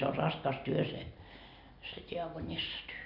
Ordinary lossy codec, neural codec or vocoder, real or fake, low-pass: none; none; real; 5.4 kHz